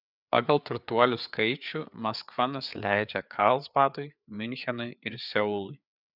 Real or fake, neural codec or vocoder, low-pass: fake; codec, 16 kHz, 4 kbps, FreqCodec, larger model; 5.4 kHz